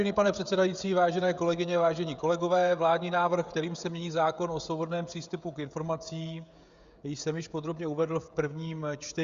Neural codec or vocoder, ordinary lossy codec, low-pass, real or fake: codec, 16 kHz, 16 kbps, FreqCodec, smaller model; Opus, 64 kbps; 7.2 kHz; fake